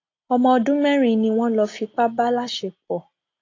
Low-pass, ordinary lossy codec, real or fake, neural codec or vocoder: 7.2 kHz; AAC, 32 kbps; real; none